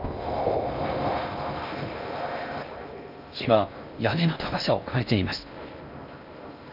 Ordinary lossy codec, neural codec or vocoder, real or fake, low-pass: none; codec, 16 kHz in and 24 kHz out, 0.6 kbps, FocalCodec, streaming, 2048 codes; fake; 5.4 kHz